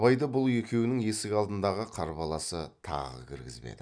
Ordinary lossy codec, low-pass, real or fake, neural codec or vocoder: none; 9.9 kHz; real; none